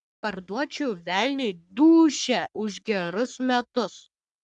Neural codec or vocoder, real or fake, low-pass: codec, 44.1 kHz, 3.4 kbps, Pupu-Codec; fake; 10.8 kHz